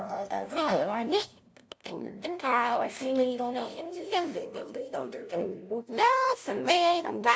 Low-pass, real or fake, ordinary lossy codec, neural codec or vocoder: none; fake; none; codec, 16 kHz, 0.5 kbps, FunCodec, trained on LibriTTS, 25 frames a second